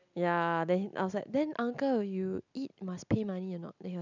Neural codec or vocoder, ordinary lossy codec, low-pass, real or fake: none; none; 7.2 kHz; real